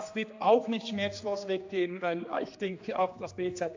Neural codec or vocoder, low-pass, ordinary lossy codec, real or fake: codec, 16 kHz, 2 kbps, X-Codec, HuBERT features, trained on general audio; 7.2 kHz; AAC, 48 kbps; fake